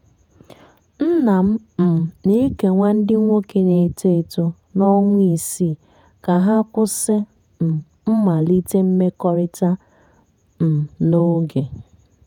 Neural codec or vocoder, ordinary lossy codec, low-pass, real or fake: vocoder, 48 kHz, 128 mel bands, Vocos; none; 19.8 kHz; fake